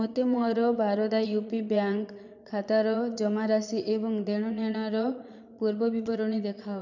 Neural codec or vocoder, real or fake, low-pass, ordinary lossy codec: vocoder, 22.05 kHz, 80 mel bands, Vocos; fake; 7.2 kHz; none